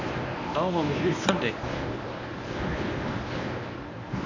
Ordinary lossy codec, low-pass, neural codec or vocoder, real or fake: AAC, 48 kbps; 7.2 kHz; codec, 24 kHz, 0.9 kbps, WavTokenizer, medium speech release version 1; fake